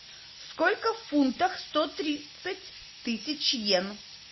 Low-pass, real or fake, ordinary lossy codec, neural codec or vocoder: 7.2 kHz; real; MP3, 24 kbps; none